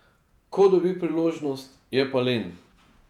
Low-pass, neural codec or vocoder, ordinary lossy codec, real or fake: 19.8 kHz; vocoder, 48 kHz, 128 mel bands, Vocos; none; fake